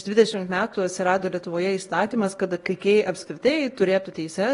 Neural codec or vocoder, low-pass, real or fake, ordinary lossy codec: codec, 24 kHz, 0.9 kbps, WavTokenizer, small release; 10.8 kHz; fake; AAC, 32 kbps